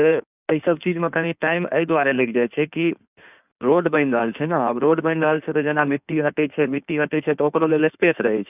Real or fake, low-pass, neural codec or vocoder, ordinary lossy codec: fake; 3.6 kHz; codec, 16 kHz in and 24 kHz out, 1.1 kbps, FireRedTTS-2 codec; none